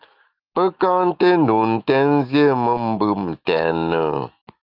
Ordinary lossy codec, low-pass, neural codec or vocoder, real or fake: Opus, 24 kbps; 5.4 kHz; autoencoder, 48 kHz, 128 numbers a frame, DAC-VAE, trained on Japanese speech; fake